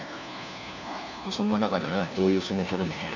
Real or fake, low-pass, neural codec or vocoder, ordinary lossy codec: fake; 7.2 kHz; codec, 16 kHz, 1 kbps, FunCodec, trained on LibriTTS, 50 frames a second; none